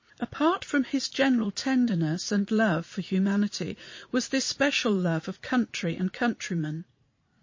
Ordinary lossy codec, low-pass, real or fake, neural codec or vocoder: MP3, 32 kbps; 7.2 kHz; fake; vocoder, 44.1 kHz, 80 mel bands, Vocos